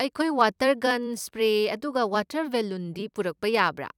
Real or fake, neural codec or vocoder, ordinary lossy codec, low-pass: fake; vocoder, 44.1 kHz, 128 mel bands every 256 samples, BigVGAN v2; none; 19.8 kHz